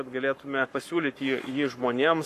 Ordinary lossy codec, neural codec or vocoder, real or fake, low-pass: AAC, 96 kbps; codec, 44.1 kHz, 7.8 kbps, Pupu-Codec; fake; 14.4 kHz